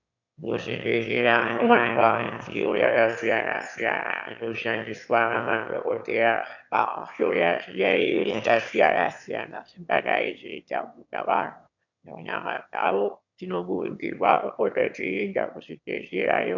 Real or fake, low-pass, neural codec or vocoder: fake; 7.2 kHz; autoencoder, 22.05 kHz, a latent of 192 numbers a frame, VITS, trained on one speaker